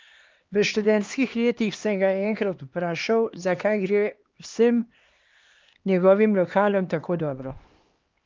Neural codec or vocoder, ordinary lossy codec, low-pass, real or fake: codec, 16 kHz, 2 kbps, X-Codec, HuBERT features, trained on LibriSpeech; Opus, 32 kbps; 7.2 kHz; fake